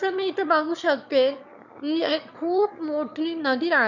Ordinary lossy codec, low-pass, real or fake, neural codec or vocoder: none; 7.2 kHz; fake; autoencoder, 22.05 kHz, a latent of 192 numbers a frame, VITS, trained on one speaker